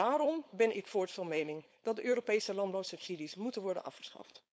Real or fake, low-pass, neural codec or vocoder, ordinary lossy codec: fake; none; codec, 16 kHz, 4.8 kbps, FACodec; none